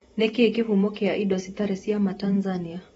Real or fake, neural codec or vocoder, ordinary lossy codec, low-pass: fake; vocoder, 48 kHz, 128 mel bands, Vocos; AAC, 24 kbps; 19.8 kHz